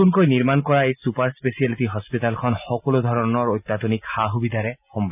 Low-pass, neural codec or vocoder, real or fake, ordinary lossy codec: 3.6 kHz; none; real; none